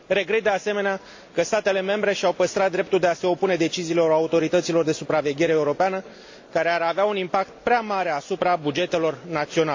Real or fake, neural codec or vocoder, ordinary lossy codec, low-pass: real; none; AAC, 48 kbps; 7.2 kHz